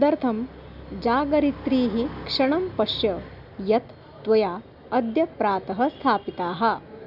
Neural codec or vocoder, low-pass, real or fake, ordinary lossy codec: none; 5.4 kHz; real; none